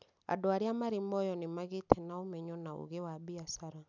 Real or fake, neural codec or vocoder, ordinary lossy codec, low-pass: real; none; none; 7.2 kHz